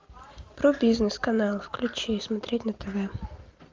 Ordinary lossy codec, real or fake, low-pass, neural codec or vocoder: Opus, 32 kbps; real; 7.2 kHz; none